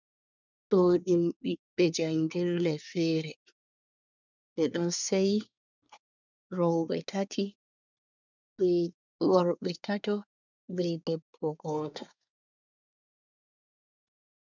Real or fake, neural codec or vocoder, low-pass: fake; codec, 24 kHz, 1 kbps, SNAC; 7.2 kHz